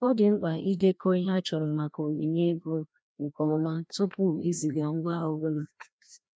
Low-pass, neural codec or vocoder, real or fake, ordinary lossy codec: none; codec, 16 kHz, 1 kbps, FreqCodec, larger model; fake; none